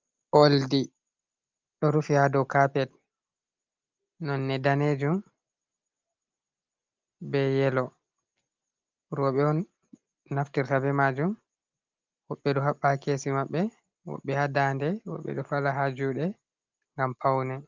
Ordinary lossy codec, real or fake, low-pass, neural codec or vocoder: Opus, 24 kbps; real; 7.2 kHz; none